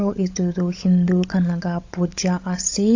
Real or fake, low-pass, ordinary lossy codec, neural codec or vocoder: fake; 7.2 kHz; AAC, 48 kbps; codec, 16 kHz, 16 kbps, FunCodec, trained on LibriTTS, 50 frames a second